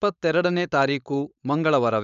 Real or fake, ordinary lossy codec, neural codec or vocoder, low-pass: fake; none; codec, 16 kHz, 4.8 kbps, FACodec; 7.2 kHz